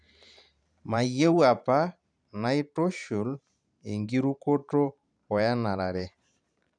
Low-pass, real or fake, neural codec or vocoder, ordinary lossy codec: 9.9 kHz; fake; vocoder, 44.1 kHz, 128 mel bands every 512 samples, BigVGAN v2; none